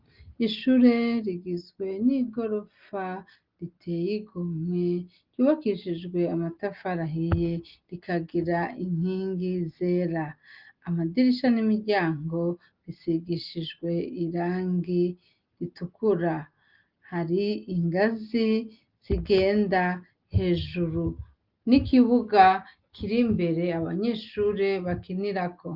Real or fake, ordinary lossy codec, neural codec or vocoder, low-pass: real; Opus, 32 kbps; none; 5.4 kHz